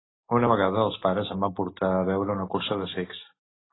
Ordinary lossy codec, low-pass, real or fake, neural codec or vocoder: AAC, 16 kbps; 7.2 kHz; real; none